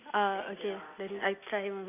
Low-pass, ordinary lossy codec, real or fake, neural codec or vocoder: 3.6 kHz; none; real; none